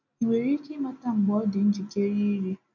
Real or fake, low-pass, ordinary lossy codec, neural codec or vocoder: real; 7.2 kHz; none; none